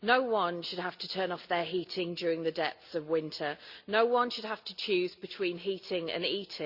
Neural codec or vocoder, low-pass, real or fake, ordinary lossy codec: none; 5.4 kHz; real; Opus, 64 kbps